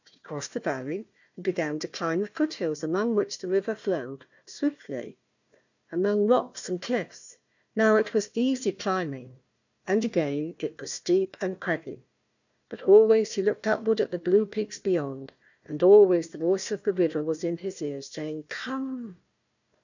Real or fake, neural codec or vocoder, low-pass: fake; codec, 16 kHz, 1 kbps, FunCodec, trained on Chinese and English, 50 frames a second; 7.2 kHz